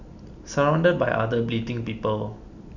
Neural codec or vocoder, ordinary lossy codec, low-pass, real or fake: none; none; 7.2 kHz; real